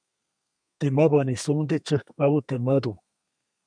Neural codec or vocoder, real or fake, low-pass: codec, 32 kHz, 1.9 kbps, SNAC; fake; 9.9 kHz